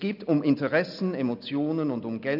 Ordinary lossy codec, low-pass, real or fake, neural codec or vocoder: none; 5.4 kHz; real; none